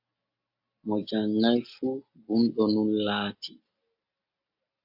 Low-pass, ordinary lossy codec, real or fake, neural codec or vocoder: 5.4 kHz; AAC, 48 kbps; real; none